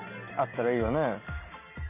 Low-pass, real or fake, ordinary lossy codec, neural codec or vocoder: 3.6 kHz; real; none; none